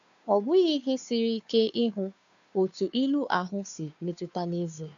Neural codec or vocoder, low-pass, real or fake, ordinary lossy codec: codec, 16 kHz, 2 kbps, FunCodec, trained on Chinese and English, 25 frames a second; 7.2 kHz; fake; none